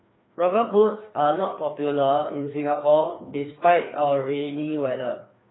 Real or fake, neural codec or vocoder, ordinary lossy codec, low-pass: fake; codec, 16 kHz, 2 kbps, FreqCodec, larger model; AAC, 16 kbps; 7.2 kHz